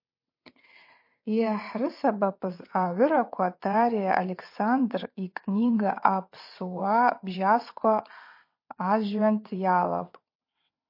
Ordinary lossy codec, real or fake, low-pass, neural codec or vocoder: MP3, 32 kbps; fake; 5.4 kHz; vocoder, 22.05 kHz, 80 mel bands, WaveNeXt